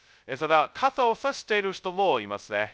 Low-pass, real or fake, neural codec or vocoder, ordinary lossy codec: none; fake; codec, 16 kHz, 0.2 kbps, FocalCodec; none